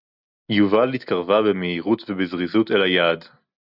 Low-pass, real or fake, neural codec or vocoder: 5.4 kHz; real; none